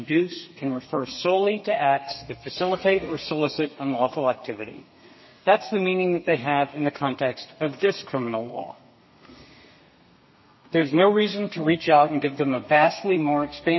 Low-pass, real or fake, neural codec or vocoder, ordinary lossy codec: 7.2 kHz; fake; codec, 32 kHz, 1.9 kbps, SNAC; MP3, 24 kbps